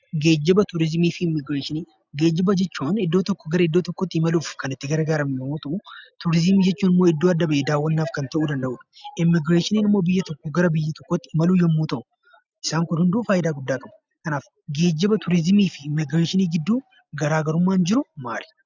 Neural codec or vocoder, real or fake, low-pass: none; real; 7.2 kHz